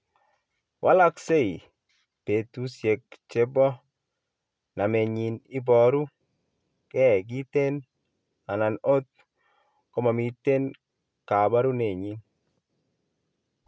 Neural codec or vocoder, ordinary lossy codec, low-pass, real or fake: none; none; none; real